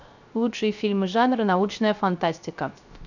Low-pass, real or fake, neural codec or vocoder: 7.2 kHz; fake; codec, 16 kHz, 0.3 kbps, FocalCodec